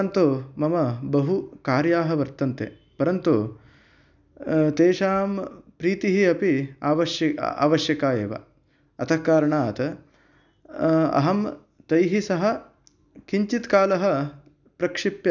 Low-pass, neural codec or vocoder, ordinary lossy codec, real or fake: 7.2 kHz; none; none; real